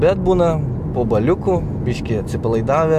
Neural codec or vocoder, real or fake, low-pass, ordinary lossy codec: none; real; 14.4 kHz; AAC, 96 kbps